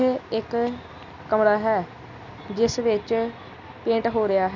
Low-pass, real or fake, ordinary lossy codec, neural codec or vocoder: 7.2 kHz; real; none; none